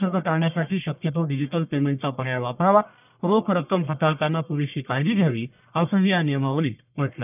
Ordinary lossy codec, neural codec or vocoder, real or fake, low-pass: none; codec, 44.1 kHz, 1.7 kbps, Pupu-Codec; fake; 3.6 kHz